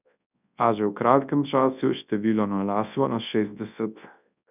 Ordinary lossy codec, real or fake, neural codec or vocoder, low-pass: none; fake; codec, 24 kHz, 0.9 kbps, WavTokenizer, large speech release; 3.6 kHz